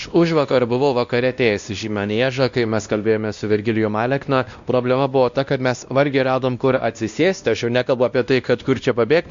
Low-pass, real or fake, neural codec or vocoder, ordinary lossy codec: 7.2 kHz; fake; codec, 16 kHz, 1 kbps, X-Codec, WavLM features, trained on Multilingual LibriSpeech; Opus, 64 kbps